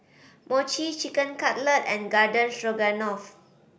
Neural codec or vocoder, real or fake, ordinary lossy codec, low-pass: none; real; none; none